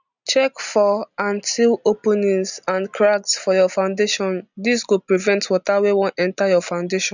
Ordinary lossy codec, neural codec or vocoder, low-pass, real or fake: none; none; 7.2 kHz; real